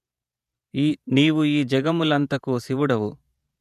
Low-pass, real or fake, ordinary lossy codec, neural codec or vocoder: 14.4 kHz; fake; none; vocoder, 48 kHz, 128 mel bands, Vocos